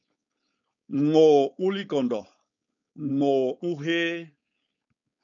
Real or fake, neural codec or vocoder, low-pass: fake; codec, 16 kHz, 4.8 kbps, FACodec; 7.2 kHz